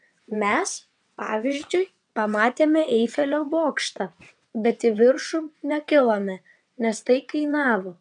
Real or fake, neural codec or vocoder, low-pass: fake; vocoder, 22.05 kHz, 80 mel bands, WaveNeXt; 9.9 kHz